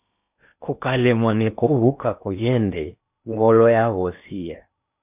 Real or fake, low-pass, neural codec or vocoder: fake; 3.6 kHz; codec, 16 kHz in and 24 kHz out, 0.8 kbps, FocalCodec, streaming, 65536 codes